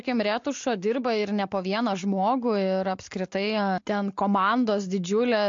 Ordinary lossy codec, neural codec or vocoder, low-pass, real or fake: MP3, 48 kbps; none; 7.2 kHz; real